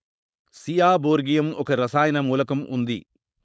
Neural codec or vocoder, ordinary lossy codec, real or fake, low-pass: codec, 16 kHz, 4.8 kbps, FACodec; none; fake; none